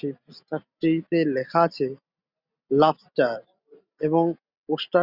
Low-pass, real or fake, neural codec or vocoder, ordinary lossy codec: 5.4 kHz; real; none; Opus, 64 kbps